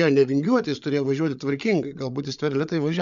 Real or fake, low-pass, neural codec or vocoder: fake; 7.2 kHz; codec, 16 kHz, 8 kbps, FreqCodec, larger model